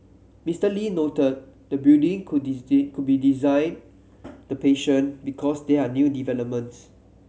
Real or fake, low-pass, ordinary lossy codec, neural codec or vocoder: real; none; none; none